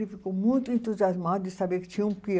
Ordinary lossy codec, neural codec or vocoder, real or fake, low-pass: none; none; real; none